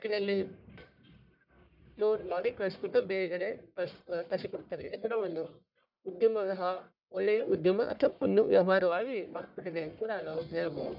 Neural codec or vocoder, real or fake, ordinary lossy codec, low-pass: codec, 44.1 kHz, 1.7 kbps, Pupu-Codec; fake; none; 5.4 kHz